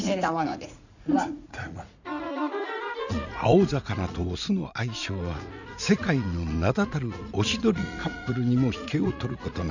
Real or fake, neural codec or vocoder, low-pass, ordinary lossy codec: fake; vocoder, 44.1 kHz, 80 mel bands, Vocos; 7.2 kHz; none